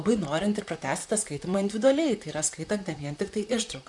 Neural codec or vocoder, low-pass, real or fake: vocoder, 44.1 kHz, 128 mel bands, Pupu-Vocoder; 10.8 kHz; fake